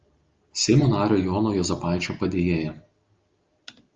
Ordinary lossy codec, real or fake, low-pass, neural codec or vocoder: Opus, 24 kbps; real; 7.2 kHz; none